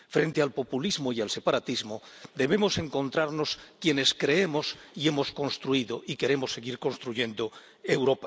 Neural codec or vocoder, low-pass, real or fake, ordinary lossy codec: none; none; real; none